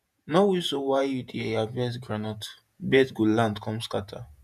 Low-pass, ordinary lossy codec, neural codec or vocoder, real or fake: 14.4 kHz; none; vocoder, 48 kHz, 128 mel bands, Vocos; fake